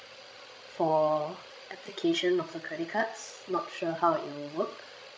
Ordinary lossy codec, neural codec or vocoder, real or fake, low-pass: none; codec, 16 kHz, 16 kbps, FreqCodec, larger model; fake; none